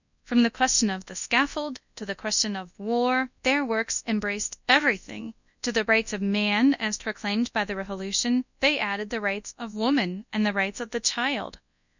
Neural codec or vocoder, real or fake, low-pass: codec, 24 kHz, 0.9 kbps, WavTokenizer, large speech release; fake; 7.2 kHz